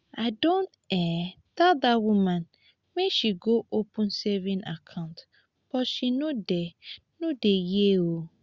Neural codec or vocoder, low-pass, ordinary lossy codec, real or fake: none; 7.2 kHz; Opus, 64 kbps; real